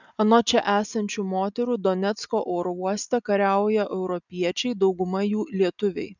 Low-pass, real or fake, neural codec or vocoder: 7.2 kHz; real; none